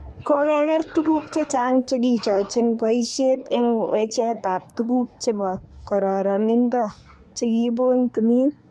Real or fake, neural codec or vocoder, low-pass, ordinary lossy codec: fake; codec, 24 kHz, 1 kbps, SNAC; none; none